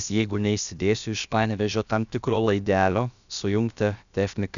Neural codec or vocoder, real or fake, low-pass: codec, 16 kHz, about 1 kbps, DyCAST, with the encoder's durations; fake; 7.2 kHz